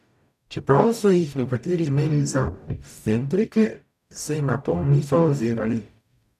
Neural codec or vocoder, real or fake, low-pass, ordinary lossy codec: codec, 44.1 kHz, 0.9 kbps, DAC; fake; 14.4 kHz; none